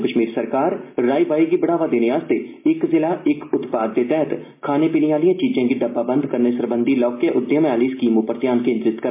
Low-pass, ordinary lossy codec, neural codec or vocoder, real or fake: 3.6 kHz; MP3, 24 kbps; none; real